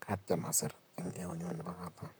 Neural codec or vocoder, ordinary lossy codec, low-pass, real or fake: vocoder, 44.1 kHz, 128 mel bands, Pupu-Vocoder; none; none; fake